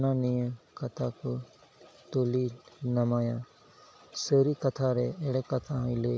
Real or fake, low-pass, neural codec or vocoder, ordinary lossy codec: real; none; none; none